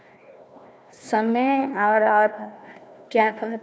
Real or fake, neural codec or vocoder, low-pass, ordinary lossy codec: fake; codec, 16 kHz, 1 kbps, FunCodec, trained on Chinese and English, 50 frames a second; none; none